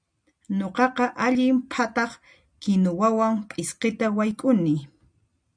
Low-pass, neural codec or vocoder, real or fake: 9.9 kHz; none; real